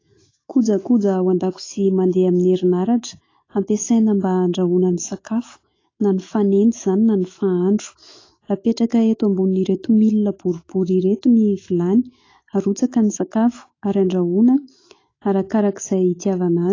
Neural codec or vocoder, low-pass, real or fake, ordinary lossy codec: autoencoder, 48 kHz, 128 numbers a frame, DAC-VAE, trained on Japanese speech; 7.2 kHz; fake; AAC, 32 kbps